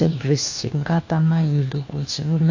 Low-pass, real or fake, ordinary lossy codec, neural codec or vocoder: 7.2 kHz; fake; AAC, 48 kbps; codec, 16 kHz, 1 kbps, FunCodec, trained on LibriTTS, 50 frames a second